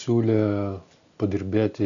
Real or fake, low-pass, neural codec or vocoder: real; 7.2 kHz; none